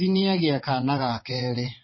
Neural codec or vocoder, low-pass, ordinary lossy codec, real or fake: vocoder, 22.05 kHz, 80 mel bands, WaveNeXt; 7.2 kHz; MP3, 24 kbps; fake